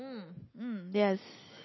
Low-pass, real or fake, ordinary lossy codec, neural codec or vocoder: 7.2 kHz; real; MP3, 24 kbps; none